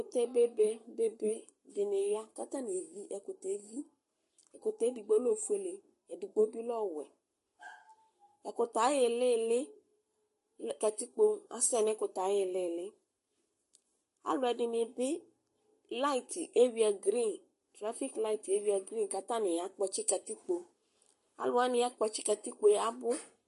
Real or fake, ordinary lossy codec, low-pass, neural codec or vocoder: fake; MP3, 48 kbps; 14.4 kHz; codec, 44.1 kHz, 7.8 kbps, Pupu-Codec